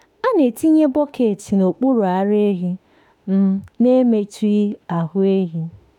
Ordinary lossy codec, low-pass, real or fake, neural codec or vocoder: none; 19.8 kHz; fake; autoencoder, 48 kHz, 32 numbers a frame, DAC-VAE, trained on Japanese speech